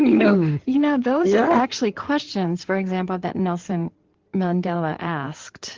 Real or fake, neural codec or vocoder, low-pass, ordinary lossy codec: fake; codec, 16 kHz in and 24 kHz out, 2.2 kbps, FireRedTTS-2 codec; 7.2 kHz; Opus, 16 kbps